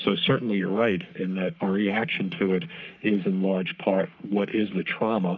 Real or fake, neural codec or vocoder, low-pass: fake; codec, 44.1 kHz, 3.4 kbps, Pupu-Codec; 7.2 kHz